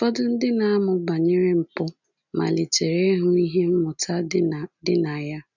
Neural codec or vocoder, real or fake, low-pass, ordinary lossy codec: none; real; 7.2 kHz; none